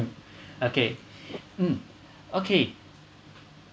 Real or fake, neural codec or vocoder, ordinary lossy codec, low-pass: real; none; none; none